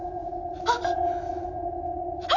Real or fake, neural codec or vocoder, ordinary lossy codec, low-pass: real; none; none; 7.2 kHz